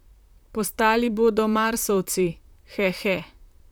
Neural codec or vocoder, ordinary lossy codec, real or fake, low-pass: vocoder, 44.1 kHz, 128 mel bands, Pupu-Vocoder; none; fake; none